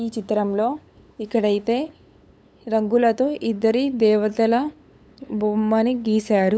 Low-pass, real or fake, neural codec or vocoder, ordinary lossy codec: none; fake; codec, 16 kHz, 8 kbps, FunCodec, trained on LibriTTS, 25 frames a second; none